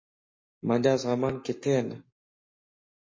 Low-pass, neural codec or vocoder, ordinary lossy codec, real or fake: 7.2 kHz; codec, 44.1 kHz, 7.8 kbps, Pupu-Codec; MP3, 32 kbps; fake